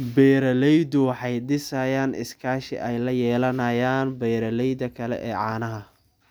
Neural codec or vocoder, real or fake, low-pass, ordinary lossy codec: none; real; none; none